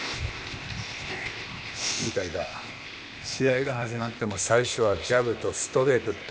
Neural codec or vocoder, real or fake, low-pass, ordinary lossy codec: codec, 16 kHz, 0.8 kbps, ZipCodec; fake; none; none